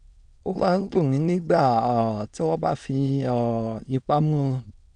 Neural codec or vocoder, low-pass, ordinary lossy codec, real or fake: autoencoder, 22.05 kHz, a latent of 192 numbers a frame, VITS, trained on many speakers; 9.9 kHz; none; fake